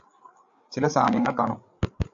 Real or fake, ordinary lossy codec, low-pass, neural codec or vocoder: fake; AAC, 64 kbps; 7.2 kHz; codec, 16 kHz, 8 kbps, FreqCodec, larger model